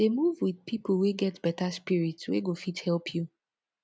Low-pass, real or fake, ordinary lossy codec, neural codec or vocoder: none; real; none; none